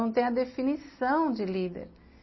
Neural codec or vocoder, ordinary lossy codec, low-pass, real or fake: none; MP3, 24 kbps; 7.2 kHz; real